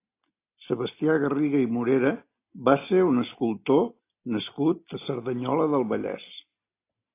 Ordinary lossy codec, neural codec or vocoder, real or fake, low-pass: AAC, 24 kbps; none; real; 3.6 kHz